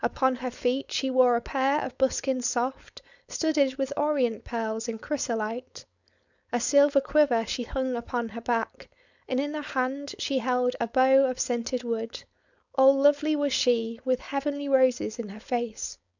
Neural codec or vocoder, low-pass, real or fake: codec, 16 kHz, 4.8 kbps, FACodec; 7.2 kHz; fake